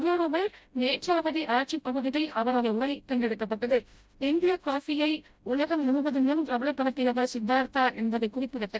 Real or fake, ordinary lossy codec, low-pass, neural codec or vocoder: fake; none; none; codec, 16 kHz, 0.5 kbps, FreqCodec, smaller model